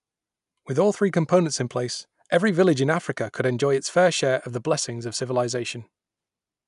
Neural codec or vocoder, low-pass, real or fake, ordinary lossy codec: none; 10.8 kHz; real; none